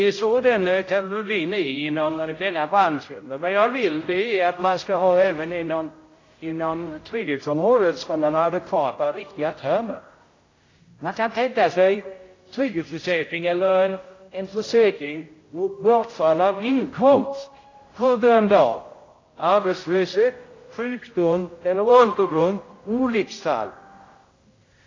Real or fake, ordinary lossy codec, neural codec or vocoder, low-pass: fake; AAC, 32 kbps; codec, 16 kHz, 0.5 kbps, X-Codec, HuBERT features, trained on general audio; 7.2 kHz